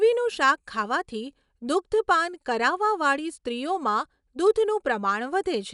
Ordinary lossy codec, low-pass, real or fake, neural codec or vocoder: none; 10.8 kHz; real; none